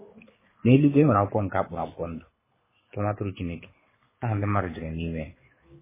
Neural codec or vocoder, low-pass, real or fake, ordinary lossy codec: codec, 24 kHz, 6 kbps, HILCodec; 3.6 kHz; fake; MP3, 16 kbps